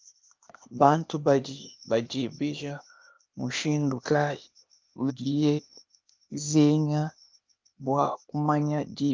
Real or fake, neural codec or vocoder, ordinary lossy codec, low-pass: fake; codec, 16 kHz, 0.8 kbps, ZipCodec; Opus, 24 kbps; 7.2 kHz